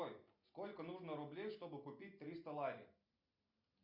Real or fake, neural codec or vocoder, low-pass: real; none; 5.4 kHz